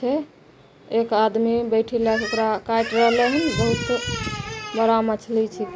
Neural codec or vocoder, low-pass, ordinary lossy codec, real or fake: none; none; none; real